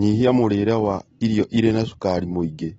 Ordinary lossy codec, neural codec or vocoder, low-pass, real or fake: AAC, 24 kbps; none; 19.8 kHz; real